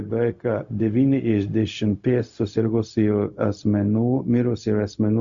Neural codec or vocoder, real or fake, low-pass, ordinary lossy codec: codec, 16 kHz, 0.4 kbps, LongCat-Audio-Codec; fake; 7.2 kHz; Opus, 64 kbps